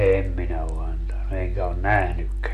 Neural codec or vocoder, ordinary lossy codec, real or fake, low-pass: none; none; real; 14.4 kHz